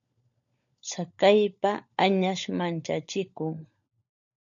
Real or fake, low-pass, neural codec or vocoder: fake; 7.2 kHz; codec, 16 kHz, 16 kbps, FunCodec, trained on LibriTTS, 50 frames a second